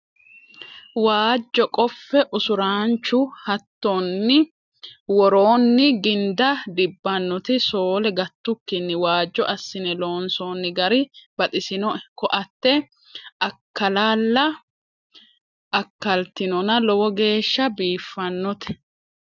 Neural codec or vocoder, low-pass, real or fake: none; 7.2 kHz; real